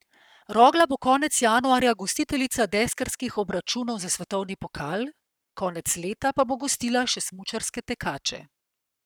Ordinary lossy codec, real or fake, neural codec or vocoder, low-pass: none; fake; codec, 44.1 kHz, 7.8 kbps, Pupu-Codec; none